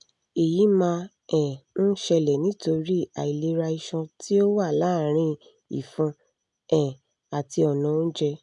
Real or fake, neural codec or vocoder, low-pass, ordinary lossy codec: real; none; 10.8 kHz; none